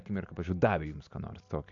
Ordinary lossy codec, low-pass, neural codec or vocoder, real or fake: AAC, 64 kbps; 7.2 kHz; none; real